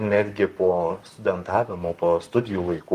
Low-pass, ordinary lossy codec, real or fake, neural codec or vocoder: 14.4 kHz; Opus, 32 kbps; fake; codec, 44.1 kHz, 7.8 kbps, Pupu-Codec